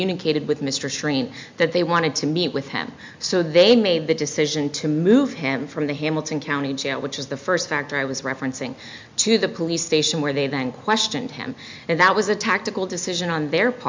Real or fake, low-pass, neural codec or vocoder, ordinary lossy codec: real; 7.2 kHz; none; MP3, 64 kbps